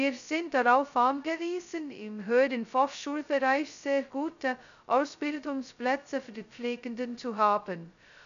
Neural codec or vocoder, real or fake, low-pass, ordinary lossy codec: codec, 16 kHz, 0.2 kbps, FocalCodec; fake; 7.2 kHz; none